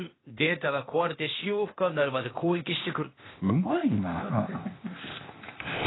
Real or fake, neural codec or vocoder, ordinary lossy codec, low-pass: fake; codec, 16 kHz, 0.8 kbps, ZipCodec; AAC, 16 kbps; 7.2 kHz